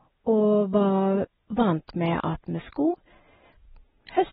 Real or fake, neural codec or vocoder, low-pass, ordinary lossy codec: real; none; 19.8 kHz; AAC, 16 kbps